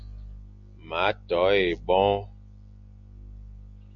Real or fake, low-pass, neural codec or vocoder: real; 7.2 kHz; none